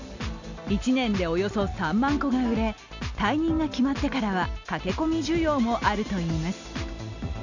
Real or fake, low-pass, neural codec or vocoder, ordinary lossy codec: real; 7.2 kHz; none; none